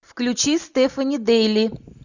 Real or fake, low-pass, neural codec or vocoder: real; 7.2 kHz; none